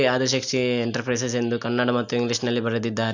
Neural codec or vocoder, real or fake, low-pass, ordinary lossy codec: none; real; 7.2 kHz; none